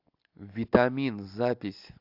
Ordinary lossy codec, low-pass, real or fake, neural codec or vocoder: AAC, 48 kbps; 5.4 kHz; real; none